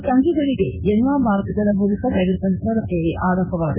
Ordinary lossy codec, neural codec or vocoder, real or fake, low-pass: none; codec, 16 kHz in and 24 kHz out, 1 kbps, XY-Tokenizer; fake; 3.6 kHz